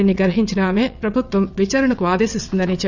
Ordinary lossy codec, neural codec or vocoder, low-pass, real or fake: none; vocoder, 22.05 kHz, 80 mel bands, WaveNeXt; 7.2 kHz; fake